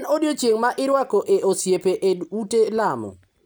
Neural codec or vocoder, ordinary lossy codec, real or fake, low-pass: none; none; real; none